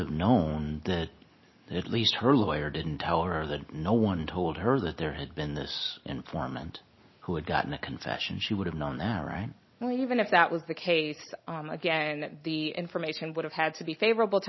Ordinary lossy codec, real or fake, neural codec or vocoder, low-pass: MP3, 24 kbps; real; none; 7.2 kHz